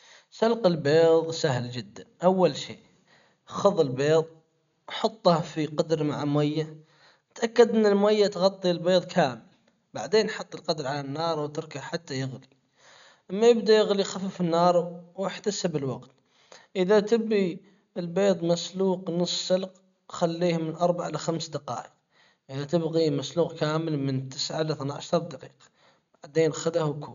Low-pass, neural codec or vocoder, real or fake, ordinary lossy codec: 7.2 kHz; none; real; none